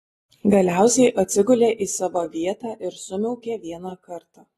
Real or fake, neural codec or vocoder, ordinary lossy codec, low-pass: real; none; AAC, 32 kbps; 19.8 kHz